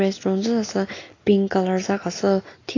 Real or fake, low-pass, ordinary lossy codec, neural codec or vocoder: real; 7.2 kHz; AAC, 32 kbps; none